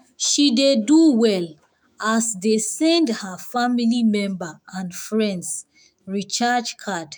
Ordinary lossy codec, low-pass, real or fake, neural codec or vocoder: none; none; fake; autoencoder, 48 kHz, 128 numbers a frame, DAC-VAE, trained on Japanese speech